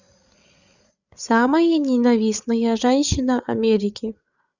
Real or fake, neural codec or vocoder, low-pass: fake; codec, 16 kHz, 8 kbps, FreqCodec, larger model; 7.2 kHz